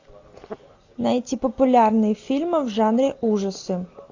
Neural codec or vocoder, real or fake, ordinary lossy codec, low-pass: none; real; AAC, 48 kbps; 7.2 kHz